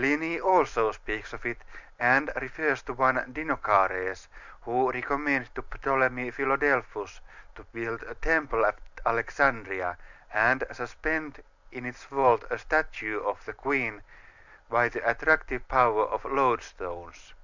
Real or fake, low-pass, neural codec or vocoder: real; 7.2 kHz; none